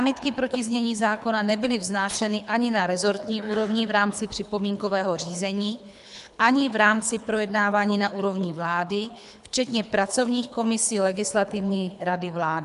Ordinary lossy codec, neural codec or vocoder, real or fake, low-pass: AAC, 96 kbps; codec, 24 kHz, 3 kbps, HILCodec; fake; 10.8 kHz